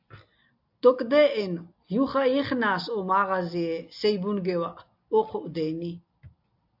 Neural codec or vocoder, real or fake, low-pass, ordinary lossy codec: none; real; 5.4 kHz; AAC, 48 kbps